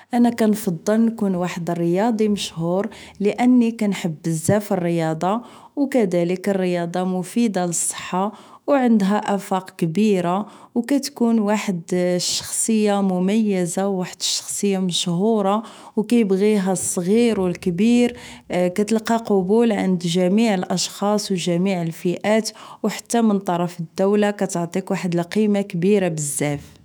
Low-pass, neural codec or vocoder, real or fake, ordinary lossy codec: none; autoencoder, 48 kHz, 128 numbers a frame, DAC-VAE, trained on Japanese speech; fake; none